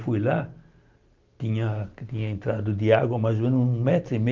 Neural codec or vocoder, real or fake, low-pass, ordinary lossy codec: none; real; 7.2 kHz; Opus, 32 kbps